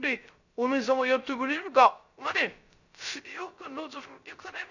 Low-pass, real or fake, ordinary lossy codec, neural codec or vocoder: 7.2 kHz; fake; none; codec, 16 kHz, 0.3 kbps, FocalCodec